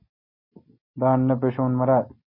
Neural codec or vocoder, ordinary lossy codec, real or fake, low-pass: none; MP3, 24 kbps; real; 5.4 kHz